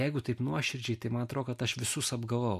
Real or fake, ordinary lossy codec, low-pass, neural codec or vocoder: real; MP3, 64 kbps; 14.4 kHz; none